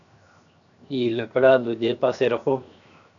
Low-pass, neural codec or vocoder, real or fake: 7.2 kHz; codec, 16 kHz, 0.7 kbps, FocalCodec; fake